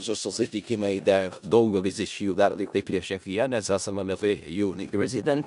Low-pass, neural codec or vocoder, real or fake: 10.8 kHz; codec, 16 kHz in and 24 kHz out, 0.4 kbps, LongCat-Audio-Codec, four codebook decoder; fake